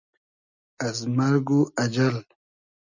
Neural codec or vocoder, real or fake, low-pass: none; real; 7.2 kHz